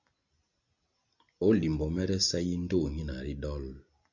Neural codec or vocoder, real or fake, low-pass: none; real; 7.2 kHz